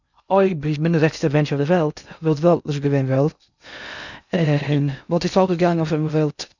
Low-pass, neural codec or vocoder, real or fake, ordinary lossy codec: 7.2 kHz; codec, 16 kHz in and 24 kHz out, 0.6 kbps, FocalCodec, streaming, 2048 codes; fake; none